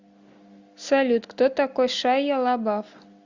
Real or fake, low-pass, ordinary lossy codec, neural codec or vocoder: real; 7.2 kHz; Opus, 64 kbps; none